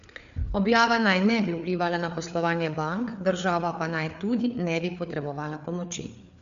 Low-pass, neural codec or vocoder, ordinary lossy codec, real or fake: 7.2 kHz; codec, 16 kHz, 4 kbps, FreqCodec, larger model; none; fake